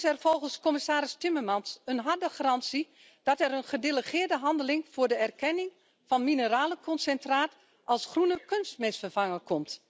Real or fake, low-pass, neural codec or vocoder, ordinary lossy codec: real; none; none; none